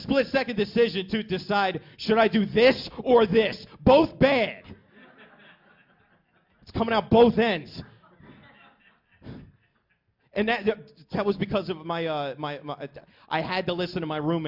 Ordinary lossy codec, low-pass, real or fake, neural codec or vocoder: MP3, 48 kbps; 5.4 kHz; real; none